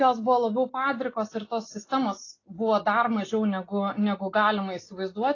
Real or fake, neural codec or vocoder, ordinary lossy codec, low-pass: real; none; AAC, 32 kbps; 7.2 kHz